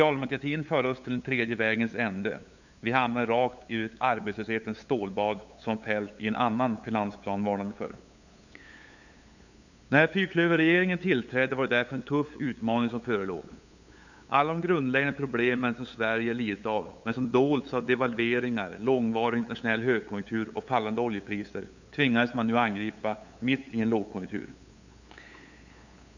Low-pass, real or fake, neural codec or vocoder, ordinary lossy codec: 7.2 kHz; fake; codec, 16 kHz, 8 kbps, FunCodec, trained on LibriTTS, 25 frames a second; none